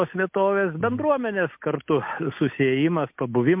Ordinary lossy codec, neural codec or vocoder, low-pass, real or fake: MP3, 32 kbps; none; 3.6 kHz; real